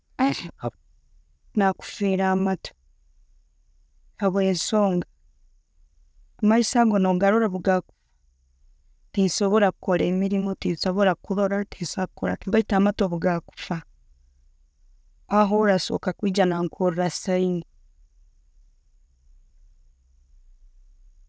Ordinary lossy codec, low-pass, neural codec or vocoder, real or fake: none; none; none; real